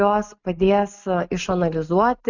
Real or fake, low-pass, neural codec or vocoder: real; 7.2 kHz; none